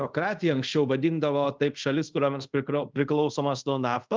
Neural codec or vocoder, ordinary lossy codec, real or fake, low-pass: codec, 24 kHz, 0.5 kbps, DualCodec; Opus, 24 kbps; fake; 7.2 kHz